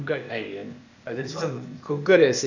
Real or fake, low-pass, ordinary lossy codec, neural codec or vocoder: fake; 7.2 kHz; none; codec, 16 kHz, 0.8 kbps, ZipCodec